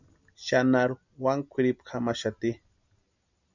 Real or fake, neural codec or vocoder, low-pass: real; none; 7.2 kHz